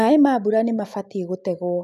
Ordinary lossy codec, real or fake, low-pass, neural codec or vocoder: none; real; 14.4 kHz; none